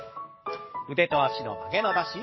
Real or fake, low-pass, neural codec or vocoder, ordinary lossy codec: fake; 7.2 kHz; codec, 44.1 kHz, 7.8 kbps, DAC; MP3, 24 kbps